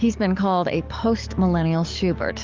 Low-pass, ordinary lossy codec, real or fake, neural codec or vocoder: 7.2 kHz; Opus, 24 kbps; fake; codec, 44.1 kHz, 7.8 kbps, DAC